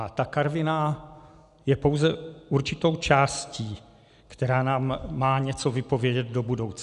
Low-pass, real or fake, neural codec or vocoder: 10.8 kHz; real; none